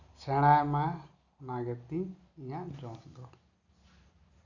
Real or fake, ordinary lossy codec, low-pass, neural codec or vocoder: real; none; 7.2 kHz; none